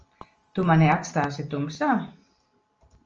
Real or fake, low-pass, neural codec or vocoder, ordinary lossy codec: real; 7.2 kHz; none; Opus, 24 kbps